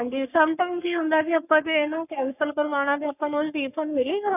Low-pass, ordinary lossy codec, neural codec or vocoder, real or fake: 3.6 kHz; none; codec, 44.1 kHz, 3.4 kbps, Pupu-Codec; fake